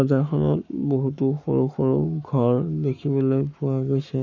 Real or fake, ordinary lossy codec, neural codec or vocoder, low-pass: fake; none; codec, 44.1 kHz, 7.8 kbps, Pupu-Codec; 7.2 kHz